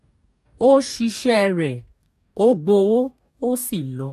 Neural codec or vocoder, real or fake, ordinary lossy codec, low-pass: codec, 44.1 kHz, 2.6 kbps, DAC; fake; AAC, 96 kbps; 14.4 kHz